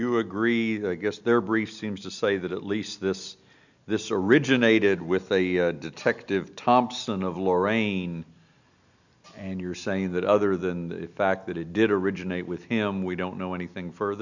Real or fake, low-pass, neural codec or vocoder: real; 7.2 kHz; none